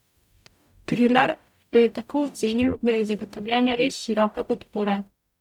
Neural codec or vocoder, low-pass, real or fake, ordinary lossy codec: codec, 44.1 kHz, 0.9 kbps, DAC; 19.8 kHz; fake; none